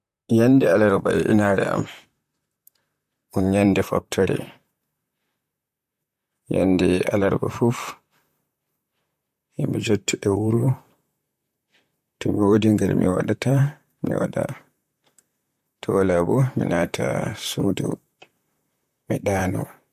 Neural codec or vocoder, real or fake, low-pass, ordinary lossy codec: vocoder, 44.1 kHz, 128 mel bands, Pupu-Vocoder; fake; 14.4 kHz; MP3, 64 kbps